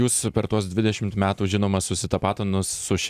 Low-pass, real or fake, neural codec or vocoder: 14.4 kHz; fake; vocoder, 44.1 kHz, 128 mel bands every 256 samples, BigVGAN v2